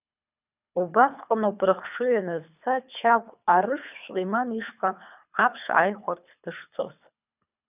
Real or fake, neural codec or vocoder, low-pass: fake; codec, 24 kHz, 3 kbps, HILCodec; 3.6 kHz